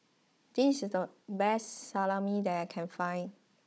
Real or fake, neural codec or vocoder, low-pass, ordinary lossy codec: fake; codec, 16 kHz, 16 kbps, FunCodec, trained on Chinese and English, 50 frames a second; none; none